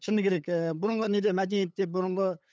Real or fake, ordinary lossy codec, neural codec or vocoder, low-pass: fake; none; codec, 16 kHz, 8 kbps, FunCodec, trained on LibriTTS, 25 frames a second; none